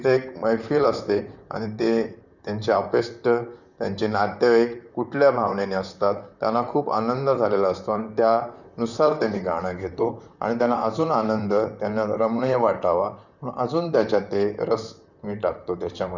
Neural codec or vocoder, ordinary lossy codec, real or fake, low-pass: vocoder, 44.1 kHz, 128 mel bands, Pupu-Vocoder; none; fake; 7.2 kHz